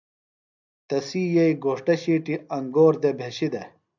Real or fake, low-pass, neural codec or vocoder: real; 7.2 kHz; none